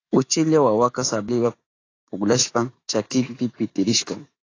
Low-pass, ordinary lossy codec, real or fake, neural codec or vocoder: 7.2 kHz; AAC, 32 kbps; fake; autoencoder, 48 kHz, 128 numbers a frame, DAC-VAE, trained on Japanese speech